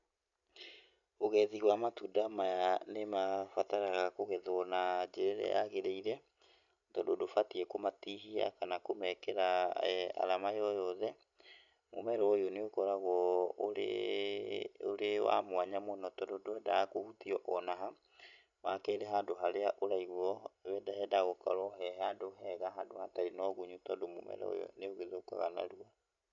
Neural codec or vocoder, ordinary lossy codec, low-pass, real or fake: none; none; 7.2 kHz; real